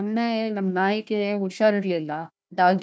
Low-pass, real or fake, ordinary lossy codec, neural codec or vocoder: none; fake; none; codec, 16 kHz, 1 kbps, FunCodec, trained on Chinese and English, 50 frames a second